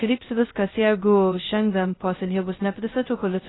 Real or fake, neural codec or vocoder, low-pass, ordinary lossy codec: fake; codec, 16 kHz, 0.2 kbps, FocalCodec; 7.2 kHz; AAC, 16 kbps